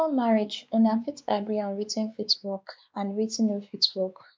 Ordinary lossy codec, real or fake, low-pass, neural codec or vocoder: none; fake; none; codec, 16 kHz, 2 kbps, X-Codec, WavLM features, trained on Multilingual LibriSpeech